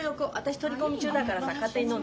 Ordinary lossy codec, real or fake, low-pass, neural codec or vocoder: none; real; none; none